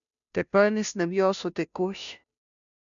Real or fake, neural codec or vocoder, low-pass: fake; codec, 16 kHz, 0.5 kbps, FunCodec, trained on Chinese and English, 25 frames a second; 7.2 kHz